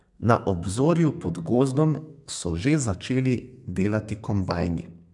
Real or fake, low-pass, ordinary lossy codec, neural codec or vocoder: fake; 10.8 kHz; MP3, 96 kbps; codec, 32 kHz, 1.9 kbps, SNAC